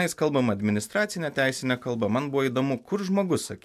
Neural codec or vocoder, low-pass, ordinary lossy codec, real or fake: none; 14.4 kHz; MP3, 96 kbps; real